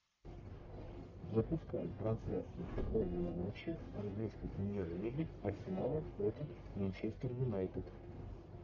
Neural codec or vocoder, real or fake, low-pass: codec, 44.1 kHz, 1.7 kbps, Pupu-Codec; fake; 7.2 kHz